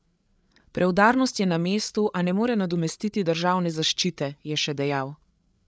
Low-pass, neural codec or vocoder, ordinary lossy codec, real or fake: none; codec, 16 kHz, 8 kbps, FreqCodec, larger model; none; fake